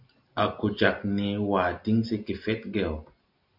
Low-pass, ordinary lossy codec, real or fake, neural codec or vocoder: 5.4 kHz; MP3, 48 kbps; real; none